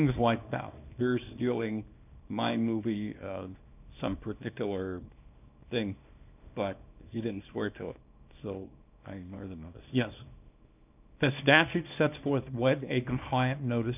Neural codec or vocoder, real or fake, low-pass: codec, 24 kHz, 0.9 kbps, WavTokenizer, small release; fake; 3.6 kHz